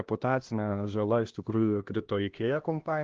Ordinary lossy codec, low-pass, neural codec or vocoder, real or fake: Opus, 16 kbps; 7.2 kHz; codec, 16 kHz, 1 kbps, X-Codec, HuBERT features, trained on LibriSpeech; fake